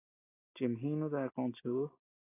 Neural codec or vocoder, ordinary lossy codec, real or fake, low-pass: none; AAC, 16 kbps; real; 3.6 kHz